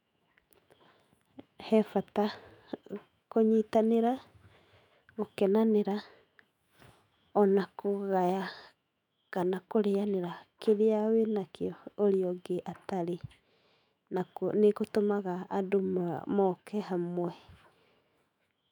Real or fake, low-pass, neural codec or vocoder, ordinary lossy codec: fake; 19.8 kHz; autoencoder, 48 kHz, 128 numbers a frame, DAC-VAE, trained on Japanese speech; none